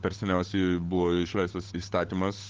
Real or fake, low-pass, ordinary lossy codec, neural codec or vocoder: fake; 7.2 kHz; Opus, 16 kbps; codec, 16 kHz, 8 kbps, FunCodec, trained on Chinese and English, 25 frames a second